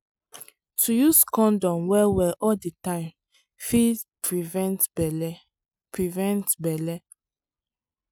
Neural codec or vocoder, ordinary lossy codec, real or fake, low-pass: none; none; real; none